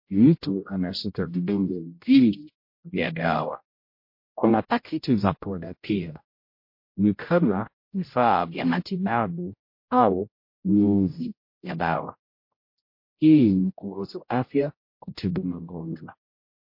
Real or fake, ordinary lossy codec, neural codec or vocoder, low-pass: fake; MP3, 32 kbps; codec, 16 kHz, 0.5 kbps, X-Codec, HuBERT features, trained on general audio; 5.4 kHz